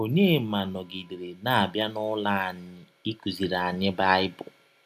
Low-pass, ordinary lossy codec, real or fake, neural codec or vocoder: 14.4 kHz; none; real; none